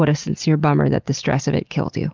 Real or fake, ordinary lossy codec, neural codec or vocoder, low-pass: real; Opus, 32 kbps; none; 7.2 kHz